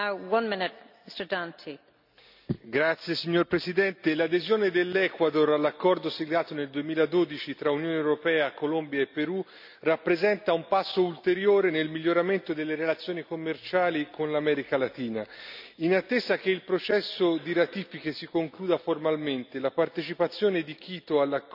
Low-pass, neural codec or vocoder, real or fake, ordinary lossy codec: 5.4 kHz; none; real; none